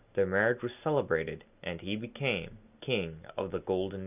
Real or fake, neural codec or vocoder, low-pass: real; none; 3.6 kHz